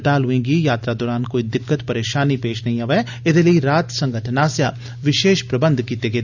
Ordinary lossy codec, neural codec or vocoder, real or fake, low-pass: none; none; real; 7.2 kHz